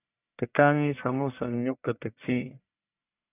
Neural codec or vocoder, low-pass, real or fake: codec, 44.1 kHz, 1.7 kbps, Pupu-Codec; 3.6 kHz; fake